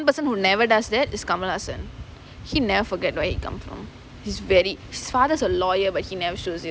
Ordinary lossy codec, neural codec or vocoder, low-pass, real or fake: none; none; none; real